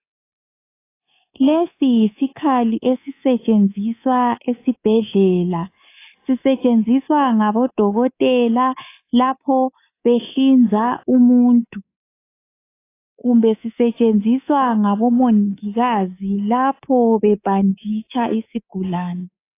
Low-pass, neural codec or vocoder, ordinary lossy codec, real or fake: 3.6 kHz; codec, 24 kHz, 3.1 kbps, DualCodec; AAC, 24 kbps; fake